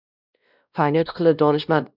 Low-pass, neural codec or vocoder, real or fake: 5.4 kHz; codec, 16 kHz, 1 kbps, X-Codec, WavLM features, trained on Multilingual LibriSpeech; fake